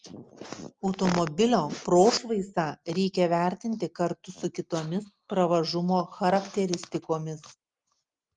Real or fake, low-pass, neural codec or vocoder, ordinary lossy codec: real; 9.9 kHz; none; Opus, 32 kbps